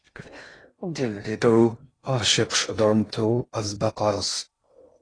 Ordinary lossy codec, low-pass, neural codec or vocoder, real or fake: AAC, 32 kbps; 9.9 kHz; codec, 16 kHz in and 24 kHz out, 0.6 kbps, FocalCodec, streaming, 2048 codes; fake